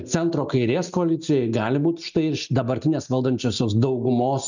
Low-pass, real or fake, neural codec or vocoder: 7.2 kHz; real; none